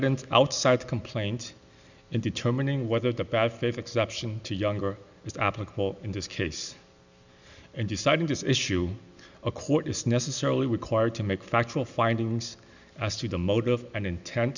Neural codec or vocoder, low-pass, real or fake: none; 7.2 kHz; real